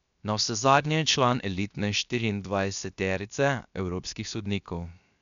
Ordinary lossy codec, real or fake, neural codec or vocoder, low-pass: none; fake; codec, 16 kHz, 0.7 kbps, FocalCodec; 7.2 kHz